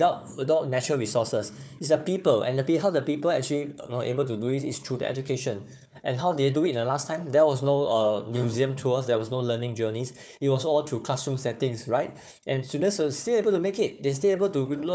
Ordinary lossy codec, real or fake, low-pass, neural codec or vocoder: none; fake; none; codec, 16 kHz, 4 kbps, FunCodec, trained on Chinese and English, 50 frames a second